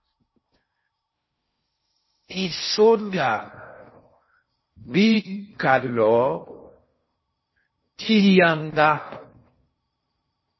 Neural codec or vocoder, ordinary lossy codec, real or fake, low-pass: codec, 16 kHz in and 24 kHz out, 0.6 kbps, FocalCodec, streaming, 4096 codes; MP3, 24 kbps; fake; 7.2 kHz